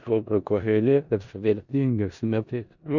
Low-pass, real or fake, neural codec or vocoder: 7.2 kHz; fake; codec, 16 kHz in and 24 kHz out, 0.4 kbps, LongCat-Audio-Codec, four codebook decoder